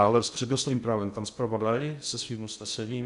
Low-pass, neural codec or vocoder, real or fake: 10.8 kHz; codec, 16 kHz in and 24 kHz out, 0.6 kbps, FocalCodec, streaming, 2048 codes; fake